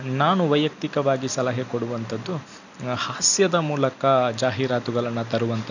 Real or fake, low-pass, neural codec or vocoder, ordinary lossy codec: real; 7.2 kHz; none; none